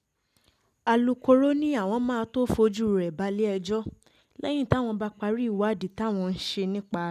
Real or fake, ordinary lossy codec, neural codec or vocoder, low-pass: real; none; none; 14.4 kHz